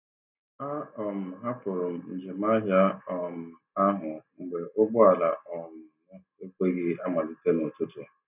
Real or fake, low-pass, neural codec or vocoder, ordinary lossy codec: real; 3.6 kHz; none; none